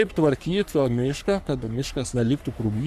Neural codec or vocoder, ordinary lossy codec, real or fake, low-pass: codec, 44.1 kHz, 3.4 kbps, Pupu-Codec; Opus, 64 kbps; fake; 14.4 kHz